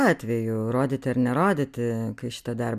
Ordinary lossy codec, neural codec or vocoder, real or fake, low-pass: MP3, 96 kbps; none; real; 14.4 kHz